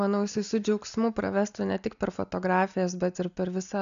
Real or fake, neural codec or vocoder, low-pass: real; none; 7.2 kHz